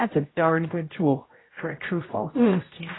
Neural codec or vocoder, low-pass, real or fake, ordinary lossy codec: codec, 16 kHz, 0.5 kbps, X-Codec, HuBERT features, trained on general audio; 7.2 kHz; fake; AAC, 16 kbps